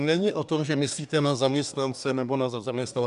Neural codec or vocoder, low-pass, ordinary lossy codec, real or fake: codec, 24 kHz, 1 kbps, SNAC; 10.8 kHz; MP3, 96 kbps; fake